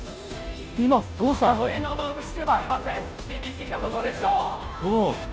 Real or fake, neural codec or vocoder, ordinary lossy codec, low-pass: fake; codec, 16 kHz, 0.5 kbps, FunCodec, trained on Chinese and English, 25 frames a second; none; none